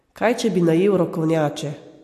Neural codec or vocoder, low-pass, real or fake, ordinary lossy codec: none; 14.4 kHz; real; AAC, 64 kbps